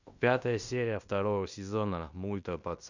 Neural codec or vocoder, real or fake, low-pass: codec, 16 kHz in and 24 kHz out, 0.9 kbps, LongCat-Audio-Codec, fine tuned four codebook decoder; fake; 7.2 kHz